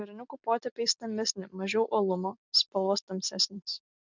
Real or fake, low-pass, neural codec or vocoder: real; 7.2 kHz; none